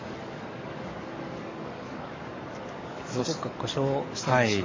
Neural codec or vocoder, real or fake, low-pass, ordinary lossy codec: codec, 44.1 kHz, 7.8 kbps, DAC; fake; 7.2 kHz; MP3, 32 kbps